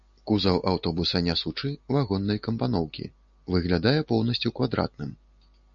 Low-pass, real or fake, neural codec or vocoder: 7.2 kHz; real; none